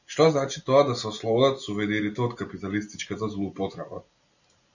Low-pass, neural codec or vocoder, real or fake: 7.2 kHz; none; real